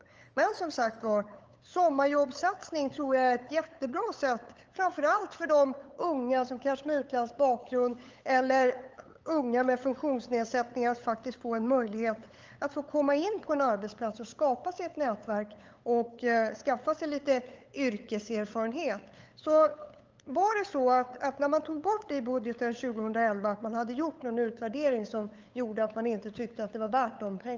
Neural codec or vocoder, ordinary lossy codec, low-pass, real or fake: codec, 16 kHz, 8 kbps, FunCodec, trained on LibriTTS, 25 frames a second; Opus, 24 kbps; 7.2 kHz; fake